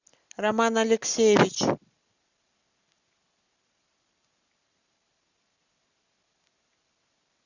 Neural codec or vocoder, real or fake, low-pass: none; real; 7.2 kHz